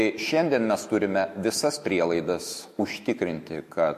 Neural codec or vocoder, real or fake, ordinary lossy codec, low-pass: autoencoder, 48 kHz, 128 numbers a frame, DAC-VAE, trained on Japanese speech; fake; MP3, 64 kbps; 14.4 kHz